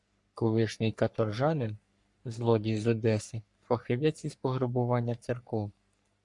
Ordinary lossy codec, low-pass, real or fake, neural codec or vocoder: MP3, 96 kbps; 10.8 kHz; fake; codec, 44.1 kHz, 3.4 kbps, Pupu-Codec